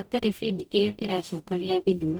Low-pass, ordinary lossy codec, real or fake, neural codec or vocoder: none; none; fake; codec, 44.1 kHz, 0.9 kbps, DAC